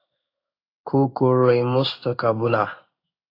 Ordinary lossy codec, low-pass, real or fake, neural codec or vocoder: AAC, 32 kbps; 5.4 kHz; fake; codec, 16 kHz in and 24 kHz out, 1 kbps, XY-Tokenizer